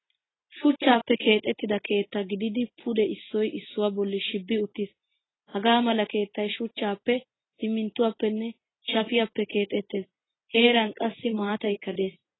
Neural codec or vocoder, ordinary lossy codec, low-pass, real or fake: none; AAC, 16 kbps; 7.2 kHz; real